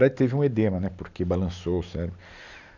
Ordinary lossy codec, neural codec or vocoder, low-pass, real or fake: none; none; 7.2 kHz; real